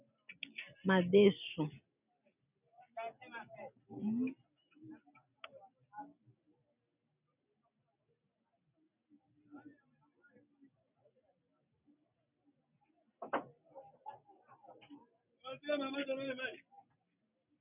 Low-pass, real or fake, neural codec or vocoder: 3.6 kHz; real; none